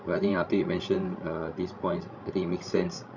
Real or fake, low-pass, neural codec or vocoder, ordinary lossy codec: fake; 7.2 kHz; codec, 16 kHz, 16 kbps, FreqCodec, larger model; none